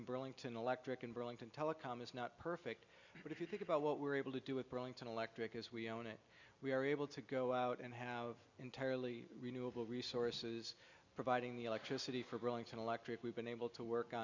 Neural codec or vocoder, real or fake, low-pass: none; real; 7.2 kHz